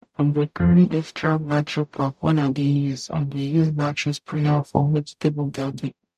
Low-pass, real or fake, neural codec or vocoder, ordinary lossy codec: 14.4 kHz; fake; codec, 44.1 kHz, 0.9 kbps, DAC; MP3, 96 kbps